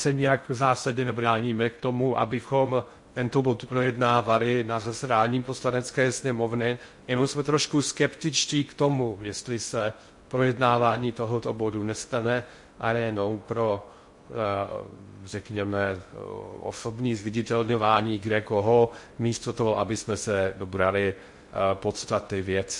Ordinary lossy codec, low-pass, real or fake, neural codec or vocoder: MP3, 48 kbps; 10.8 kHz; fake; codec, 16 kHz in and 24 kHz out, 0.6 kbps, FocalCodec, streaming, 2048 codes